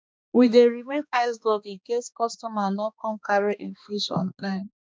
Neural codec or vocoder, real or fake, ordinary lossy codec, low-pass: codec, 16 kHz, 2 kbps, X-Codec, HuBERT features, trained on balanced general audio; fake; none; none